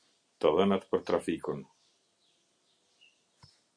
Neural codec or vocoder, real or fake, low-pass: none; real; 9.9 kHz